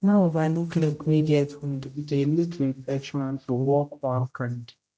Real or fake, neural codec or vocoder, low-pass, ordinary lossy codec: fake; codec, 16 kHz, 0.5 kbps, X-Codec, HuBERT features, trained on general audio; none; none